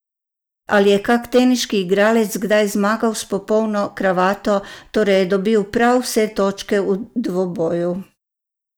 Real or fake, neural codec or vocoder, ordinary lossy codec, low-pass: real; none; none; none